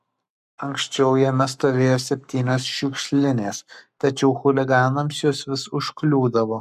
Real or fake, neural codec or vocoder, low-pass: fake; codec, 44.1 kHz, 7.8 kbps, Pupu-Codec; 14.4 kHz